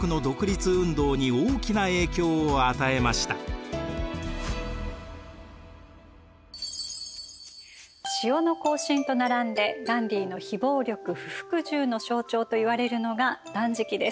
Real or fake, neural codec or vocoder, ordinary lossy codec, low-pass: real; none; none; none